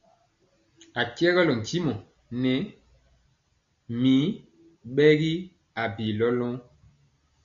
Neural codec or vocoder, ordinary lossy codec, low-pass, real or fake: none; Opus, 64 kbps; 7.2 kHz; real